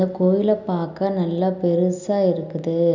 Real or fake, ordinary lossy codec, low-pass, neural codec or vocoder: real; none; 7.2 kHz; none